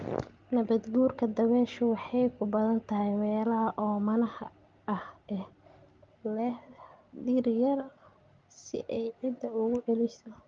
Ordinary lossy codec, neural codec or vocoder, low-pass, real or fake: Opus, 32 kbps; none; 7.2 kHz; real